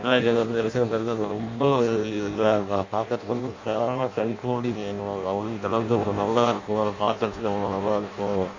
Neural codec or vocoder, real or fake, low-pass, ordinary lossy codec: codec, 16 kHz in and 24 kHz out, 0.6 kbps, FireRedTTS-2 codec; fake; 7.2 kHz; MP3, 48 kbps